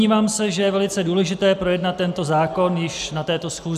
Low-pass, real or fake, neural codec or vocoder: 14.4 kHz; real; none